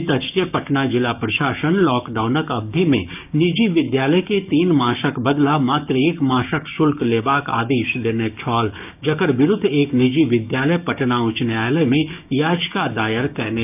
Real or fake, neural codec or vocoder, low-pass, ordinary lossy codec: fake; codec, 16 kHz, 6 kbps, DAC; 3.6 kHz; none